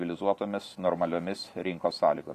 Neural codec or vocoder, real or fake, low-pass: vocoder, 44.1 kHz, 128 mel bands every 512 samples, BigVGAN v2; fake; 14.4 kHz